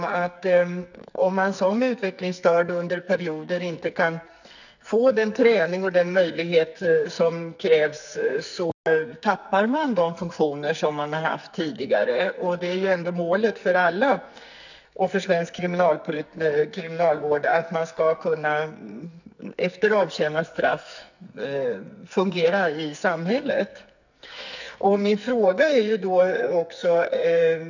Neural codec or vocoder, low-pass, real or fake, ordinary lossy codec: codec, 44.1 kHz, 2.6 kbps, SNAC; 7.2 kHz; fake; none